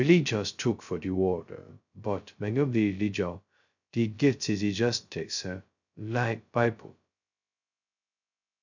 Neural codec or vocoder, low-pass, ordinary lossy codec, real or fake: codec, 16 kHz, 0.2 kbps, FocalCodec; 7.2 kHz; none; fake